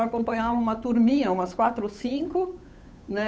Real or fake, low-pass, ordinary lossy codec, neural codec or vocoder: fake; none; none; codec, 16 kHz, 8 kbps, FunCodec, trained on Chinese and English, 25 frames a second